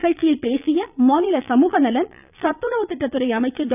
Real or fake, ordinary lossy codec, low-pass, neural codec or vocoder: fake; none; 3.6 kHz; vocoder, 22.05 kHz, 80 mel bands, Vocos